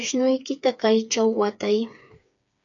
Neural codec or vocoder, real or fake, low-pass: codec, 16 kHz, 4 kbps, FreqCodec, smaller model; fake; 7.2 kHz